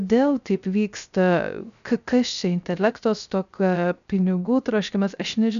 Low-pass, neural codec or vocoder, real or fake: 7.2 kHz; codec, 16 kHz, 0.3 kbps, FocalCodec; fake